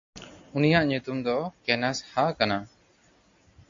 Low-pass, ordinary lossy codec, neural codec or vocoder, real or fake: 7.2 kHz; AAC, 48 kbps; none; real